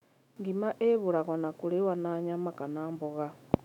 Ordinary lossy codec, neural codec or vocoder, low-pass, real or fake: none; autoencoder, 48 kHz, 128 numbers a frame, DAC-VAE, trained on Japanese speech; 19.8 kHz; fake